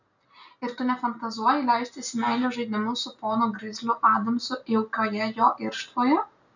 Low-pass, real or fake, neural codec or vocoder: 7.2 kHz; real; none